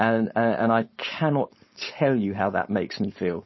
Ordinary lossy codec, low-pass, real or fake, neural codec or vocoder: MP3, 24 kbps; 7.2 kHz; fake; codec, 16 kHz, 4.8 kbps, FACodec